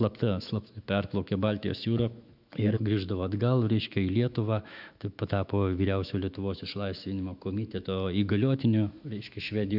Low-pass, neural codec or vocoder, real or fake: 5.4 kHz; autoencoder, 48 kHz, 128 numbers a frame, DAC-VAE, trained on Japanese speech; fake